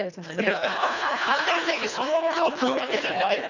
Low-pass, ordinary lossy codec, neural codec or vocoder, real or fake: 7.2 kHz; none; codec, 24 kHz, 1.5 kbps, HILCodec; fake